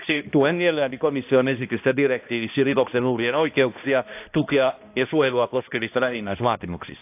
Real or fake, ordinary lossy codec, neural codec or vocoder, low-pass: fake; AAC, 32 kbps; codec, 16 kHz, 1 kbps, X-Codec, HuBERT features, trained on balanced general audio; 3.6 kHz